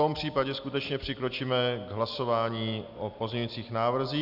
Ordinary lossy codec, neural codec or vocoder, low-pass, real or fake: AAC, 48 kbps; none; 5.4 kHz; real